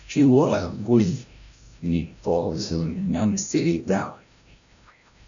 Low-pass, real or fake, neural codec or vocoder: 7.2 kHz; fake; codec, 16 kHz, 0.5 kbps, FreqCodec, larger model